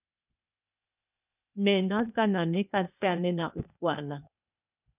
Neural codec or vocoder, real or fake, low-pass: codec, 16 kHz, 0.8 kbps, ZipCodec; fake; 3.6 kHz